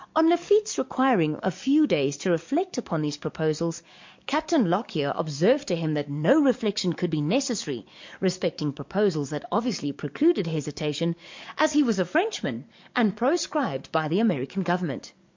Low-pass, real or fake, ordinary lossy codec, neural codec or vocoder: 7.2 kHz; fake; MP3, 48 kbps; codec, 44.1 kHz, 7.8 kbps, DAC